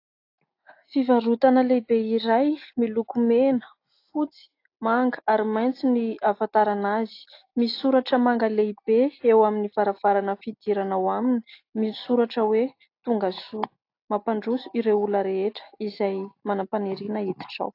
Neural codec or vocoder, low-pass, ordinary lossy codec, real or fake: none; 5.4 kHz; AAC, 32 kbps; real